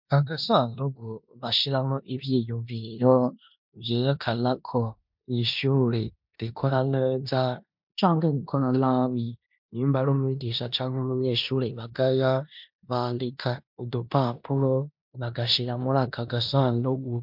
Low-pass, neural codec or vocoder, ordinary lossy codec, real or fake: 5.4 kHz; codec, 16 kHz in and 24 kHz out, 0.9 kbps, LongCat-Audio-Codec, four codebook decoder; MP3, 48 kbps; fake